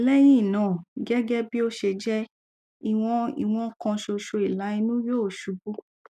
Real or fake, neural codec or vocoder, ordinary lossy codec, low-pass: real; none; none; 14.4 kHz